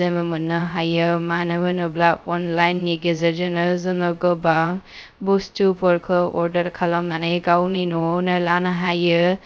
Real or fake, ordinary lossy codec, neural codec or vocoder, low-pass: fake; none; codec, 16 kHz, 0.2 kbps, FocalCodec; none